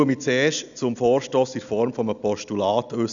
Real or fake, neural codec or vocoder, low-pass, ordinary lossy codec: real; none; 7.2 kHz; none